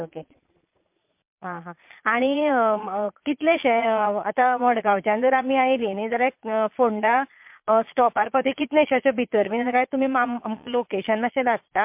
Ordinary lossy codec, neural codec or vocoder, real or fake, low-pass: MP3, 32 kbps; vocoder, 22.05 kHz, 80 mel bands, Vocos; fake; 3.6 kHz